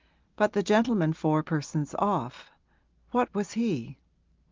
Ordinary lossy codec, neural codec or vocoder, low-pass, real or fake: Opus, 32 kbps; none; 7.2 kHz; real